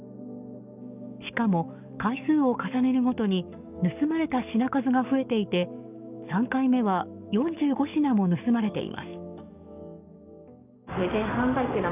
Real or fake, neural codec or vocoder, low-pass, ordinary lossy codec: fake; codec, 44.1 kHz, 7.8 kbps, DAC; 3.6 kHz; none